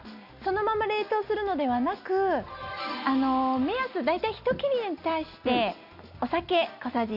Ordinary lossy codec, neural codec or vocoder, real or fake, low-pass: none; none; real; 5.4 kHz